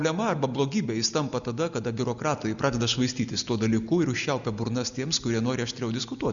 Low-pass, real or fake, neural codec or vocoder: 7.2 kHz; real; none